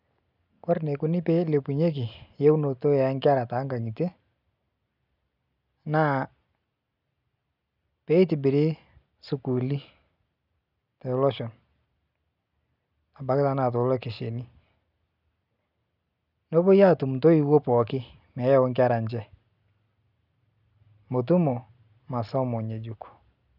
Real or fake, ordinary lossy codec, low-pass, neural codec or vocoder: real; none; 5.4 kHz; none